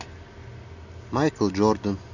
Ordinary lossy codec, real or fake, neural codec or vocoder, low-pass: none; real; none; 7.2 kHz